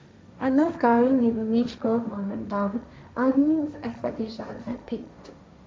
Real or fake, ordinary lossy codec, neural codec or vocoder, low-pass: fake; none; codec, 16 kHz, 1.1 kbps, Voila-Tokenizer; none